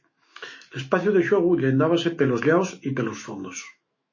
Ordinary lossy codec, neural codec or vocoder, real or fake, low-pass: MP3, 32 kbps; autoencoder, 48 kHz, 128 numbers a frame, DAC-VAE, trained on Japanese speech; fake; 7.2 kHz